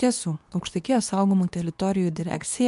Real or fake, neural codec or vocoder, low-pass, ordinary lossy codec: fake; codec, 24 kHz, 0.9 kbps, WavTokenizer, medium speech release version 2; 10.8 kHz; MP3, 64 kbps